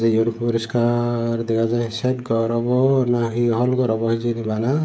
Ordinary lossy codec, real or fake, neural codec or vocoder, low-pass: none; fake; codec, 16 kHz, 16 kbps, FreqCodec, smaller model; none